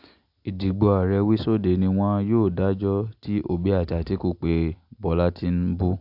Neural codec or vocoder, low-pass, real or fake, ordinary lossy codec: none; 5.4 kHz; real; AAC, 48 kbps